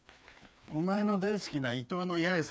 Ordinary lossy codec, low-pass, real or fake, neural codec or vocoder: none; none; fake; codec, 16 kHz, 2 kbps, FreqCodec, larger model